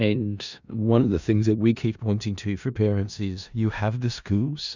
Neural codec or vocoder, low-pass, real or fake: codec, 16 kHz in and 24 kHz out, 0.4 kbps, LongCat-Audio-Codec, four codebook decoder; 7.2 kHz; fake